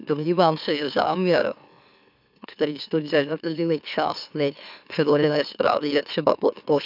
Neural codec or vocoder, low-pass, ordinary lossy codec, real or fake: autoencoder, 44.1 kHz, a latent of 192 numbers a frame, MeloTTS; 5.4 kHz; none; fake